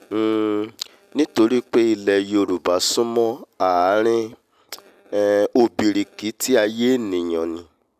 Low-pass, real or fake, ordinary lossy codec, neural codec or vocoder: 14.4 kHz; real; none; none